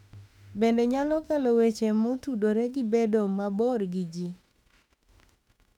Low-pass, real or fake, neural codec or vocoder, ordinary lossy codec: 19.8 kHz; fake; autoencoder, 48 kHz, 32 numbers a frame, DAC-VAE, trained on Japanese speech; none